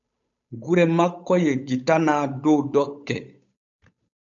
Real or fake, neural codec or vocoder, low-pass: fake; codec, 16 kHz, 8 kbps, FunCodec, trained on Chinese and English, 25 frames a second; 7.2 kHz